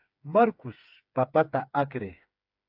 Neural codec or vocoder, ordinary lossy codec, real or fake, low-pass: codec, 16 kHz, 4 kbps, FreqCodec, smaller model; AAC, 48 kbps; fake; 5.4 kHz